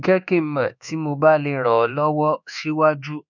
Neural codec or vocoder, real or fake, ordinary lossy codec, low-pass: codec, 24 kHz, 1.2 kbps, DualCodec; fake; none; 7.2 kHz